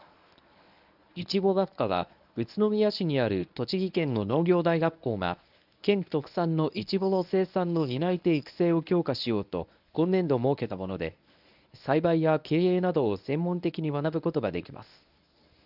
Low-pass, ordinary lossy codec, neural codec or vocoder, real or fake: 5.4 kHz; none; codec, 24 kHz, 0.9 kbps, WavTokenizer, medium speech release version 1; fake